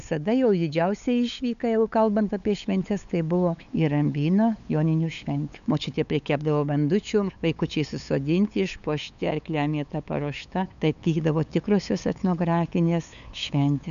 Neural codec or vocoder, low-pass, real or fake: codec, 16 kHz, 8 kbps, FunCodec, trained on LibriTTS, 25 frames a second; 7.2 kHz; fake